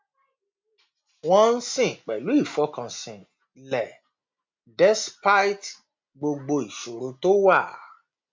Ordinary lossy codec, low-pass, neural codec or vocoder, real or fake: MP3, 64 kbps; 7.2 kHz; none; real